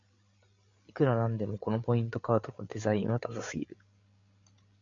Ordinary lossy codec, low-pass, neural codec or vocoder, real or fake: MP3, 64 kbps; 7.2 kHz; codec, 16 kHz, 8 kbps, FreqCodec, larger model; fake